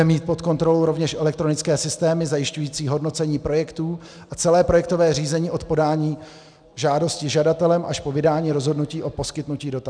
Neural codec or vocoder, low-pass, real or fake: none; 9.9 kHz; real